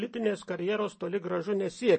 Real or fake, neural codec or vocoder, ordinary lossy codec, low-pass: real; none; MP3, 32 kbps; 10.8 kHz